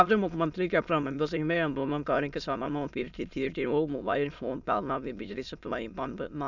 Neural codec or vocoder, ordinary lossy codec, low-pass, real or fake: autoencoder, 22.05 kHz, a latent of 192 numbers a frame, VITS, trained on many speakers; none; 7.2 kHz; fake